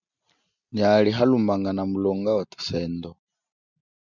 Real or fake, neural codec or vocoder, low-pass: real; none; 7.2 kHz